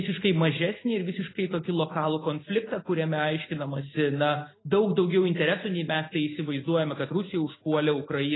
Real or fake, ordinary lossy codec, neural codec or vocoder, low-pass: real; AAC, 16 kbps; none; 7.2 kHz